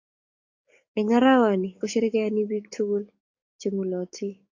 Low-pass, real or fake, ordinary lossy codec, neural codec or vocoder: 7.2 kHz; fake; AAC, 48 kbps; codec, 44.1 kHz, 7.8 kbps, DAC